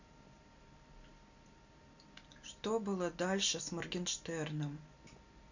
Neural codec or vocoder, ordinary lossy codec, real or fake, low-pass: none; none; real; 7.2 kHz